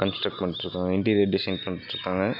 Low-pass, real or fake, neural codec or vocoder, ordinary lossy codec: 5.4 kHz; real; none; none